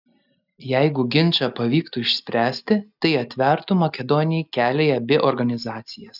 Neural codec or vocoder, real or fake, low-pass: none; real; 5.4 kHz